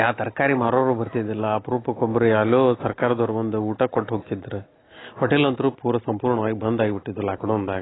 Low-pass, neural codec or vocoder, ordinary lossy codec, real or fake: 7.2 kHz; none; AAC, 16 kbps; real